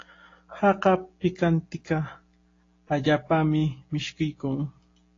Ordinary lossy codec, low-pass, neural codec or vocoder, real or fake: AAC, 32 kbps; 7.2 kHz; none; real